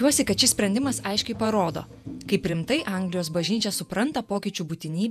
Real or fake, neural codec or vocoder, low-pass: fake; vocoder, 48 kHz, 128 mel bands, Vocos; 14.4 kHz